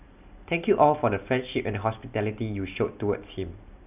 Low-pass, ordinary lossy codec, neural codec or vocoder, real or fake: 3.6 kHz; none; none; real